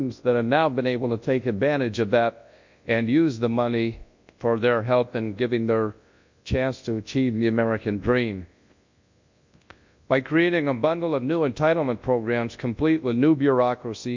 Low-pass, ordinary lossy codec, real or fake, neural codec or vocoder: 7.2 kHz; MP3, 48 kbps; fake; codec, 24 kHz, 0.9 kbps, WavTokenizer, large speech release